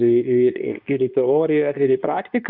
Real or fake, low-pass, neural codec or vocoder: fake; 5.4 kHz; codec, 24 kHz, 0.9 kbps, WavTokenizer, medium speech release version 2